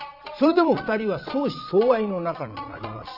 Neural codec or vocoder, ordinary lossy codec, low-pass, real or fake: vocoder, 22.05 kHz, 80 mel bands, Vocos; none; 5.4 kHz; fake